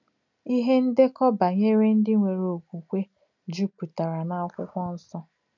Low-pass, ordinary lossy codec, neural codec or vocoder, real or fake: 7.2 kHz; none; none; real